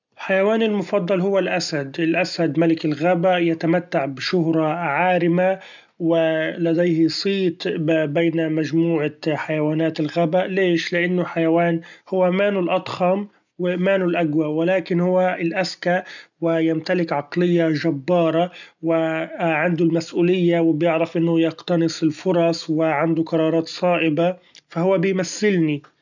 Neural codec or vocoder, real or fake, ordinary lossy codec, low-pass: none; real; none; 7.2 kHz